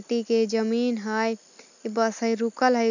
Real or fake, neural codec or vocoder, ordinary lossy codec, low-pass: real; none; none; 7.2 kHz